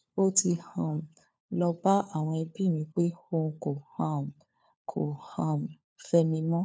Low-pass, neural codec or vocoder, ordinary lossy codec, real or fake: none; codec, 16 kHz, 4 kbps, FunCodec, trained on LibriTTS, 50 frames a second; none; fake